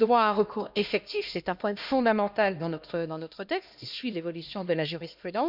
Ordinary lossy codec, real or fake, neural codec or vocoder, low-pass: none; fake; codec, 16 kHz, 1 kbps, X-Codec, HuBERT features, trained on LibriSpeech; 5.4 kHz